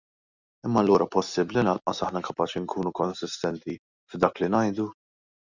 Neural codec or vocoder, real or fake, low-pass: none; real; 7.2 kHz